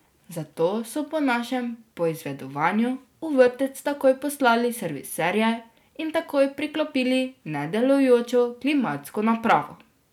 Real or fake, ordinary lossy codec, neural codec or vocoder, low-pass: real; none; none; 19.8 kHz